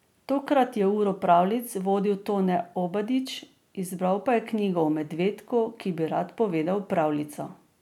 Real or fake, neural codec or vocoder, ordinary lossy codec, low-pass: real; none; none; 19.8 kHz